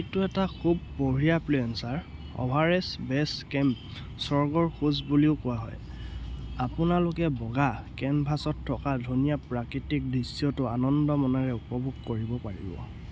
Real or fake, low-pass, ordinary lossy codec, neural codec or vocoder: real; none; none; none